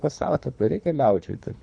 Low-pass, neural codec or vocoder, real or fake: 9.9 kHz; codec, 24 kHz, 3 kbps, HILCodec; fake